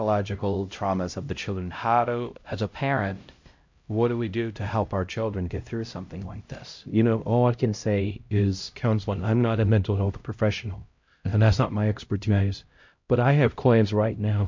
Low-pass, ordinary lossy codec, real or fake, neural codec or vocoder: 7.2 kHz; MP3, 48 kbps; fake; codec, 16 kHz, 0.5 kbps, X-Codec, HuBERT features, trained on LibriSpeech